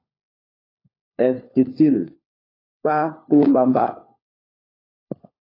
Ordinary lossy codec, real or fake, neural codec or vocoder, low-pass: AAC, 24 kbps; fake; codec, 16 kHz, 4 kbps, FunCodec, trained on LibriTTS, 50 frames a second; 5.4 kHz